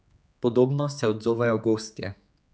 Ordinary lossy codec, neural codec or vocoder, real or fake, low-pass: none; codec, 16 kHz, 4 kbps, X-Codec, HuBERT features, trained on general audio; fake; none